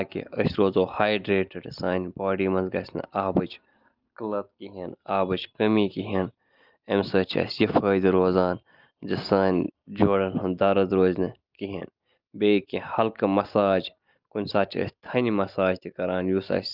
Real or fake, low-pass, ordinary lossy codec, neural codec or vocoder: real; 5.4 kHz; Opus, 24 kbps; none